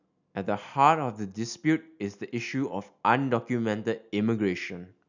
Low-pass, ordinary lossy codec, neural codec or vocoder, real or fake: 7.2 kHz; none; none; real